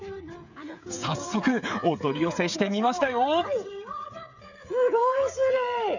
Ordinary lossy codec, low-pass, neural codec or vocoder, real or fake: none; 7.2 kHz; codec, 16 kHz, 8 kbps, FreqCodec, smaller model; fake